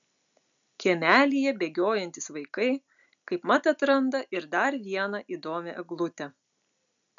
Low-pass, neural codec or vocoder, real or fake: 7.2 kHz; none; real